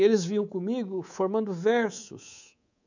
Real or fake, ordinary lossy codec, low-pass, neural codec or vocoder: fake; none; 7.2 kHz; codec, 24 kHz, 3.1 kbps, DualCodec